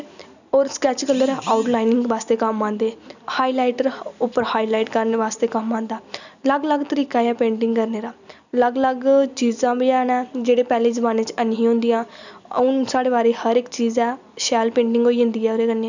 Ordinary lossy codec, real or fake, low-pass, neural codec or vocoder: none; real; 7.2 kHz; none